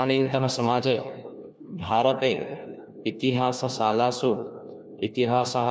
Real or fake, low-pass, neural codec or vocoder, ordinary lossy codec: fake; none; codec, 16 kHz, 1 kbps, FunCodec, trained on LibriTTS, 50 frames a second; none